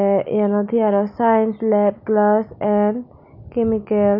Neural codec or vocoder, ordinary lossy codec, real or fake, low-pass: none; none; real; 5.4 kHz